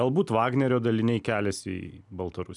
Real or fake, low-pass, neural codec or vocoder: real; 10.8 kHz; none